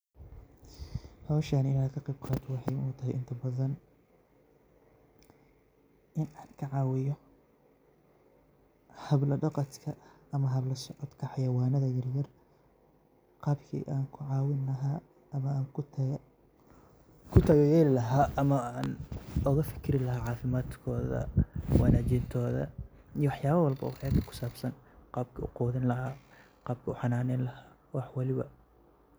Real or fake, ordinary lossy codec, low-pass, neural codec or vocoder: real; none; none; none